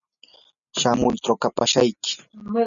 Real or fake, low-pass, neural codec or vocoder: real; 7.2 kHz; none